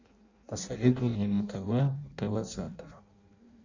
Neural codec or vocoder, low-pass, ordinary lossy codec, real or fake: codec, 16 kHz in and 24 kHz out, 0.6 kbps, FireRedTTS-2 codec; 7.2 kHz; Opus, 64 kbps; fake